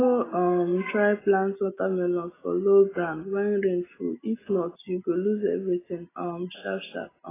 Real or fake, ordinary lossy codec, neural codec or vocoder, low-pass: real; AAC, 16 kbps; none; 3.6 kHz